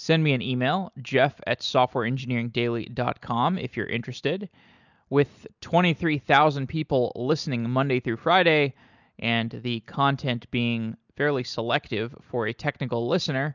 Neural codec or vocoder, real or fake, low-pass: none; real; 7.2 kHz